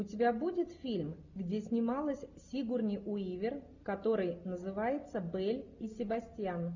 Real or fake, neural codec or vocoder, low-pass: real; none; 7.2 kHz